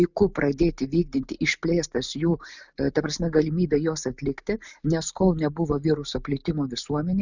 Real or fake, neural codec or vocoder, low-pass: real; none; 7.2 kHz